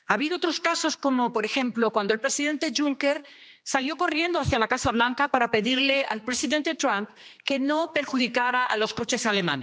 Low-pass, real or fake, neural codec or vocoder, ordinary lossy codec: none; fake; codec, 16 kHz, 2 kbps, X-Codec, HuBERT features, trained on general audio; none